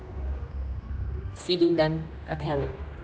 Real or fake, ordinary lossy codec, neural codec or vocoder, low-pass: fake; none; codec, 16 kHz, 1 kbps, X-Codec, HuBERT features, trained on general audio; none